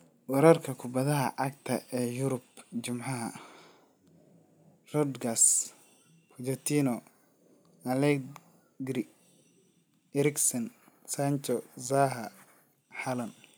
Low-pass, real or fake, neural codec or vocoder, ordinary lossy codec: none; real; none; none